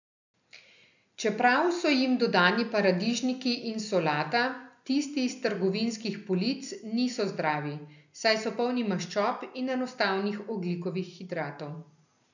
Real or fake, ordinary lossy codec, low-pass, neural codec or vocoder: real; none; 7.2 kHz; none